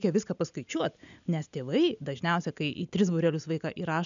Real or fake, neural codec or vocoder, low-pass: real; none; 7.2 kHz